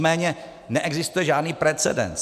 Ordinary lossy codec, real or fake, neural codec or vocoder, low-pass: MP3, 96 kbps; real; none; 14.4 kHz